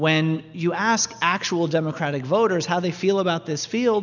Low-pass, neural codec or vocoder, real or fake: 7.2 kHz; none; real